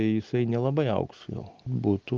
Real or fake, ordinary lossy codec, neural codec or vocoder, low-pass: real; Opus, 32 kbps; none; 7.2 kHz